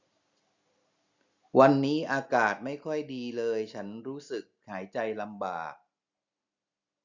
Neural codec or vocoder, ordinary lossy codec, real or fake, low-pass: none; Opus, 64 kbps; real; 7.2 kHz